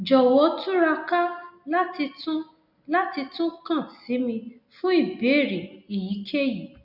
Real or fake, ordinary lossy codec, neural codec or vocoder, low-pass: real; none; none; 5.4 kHz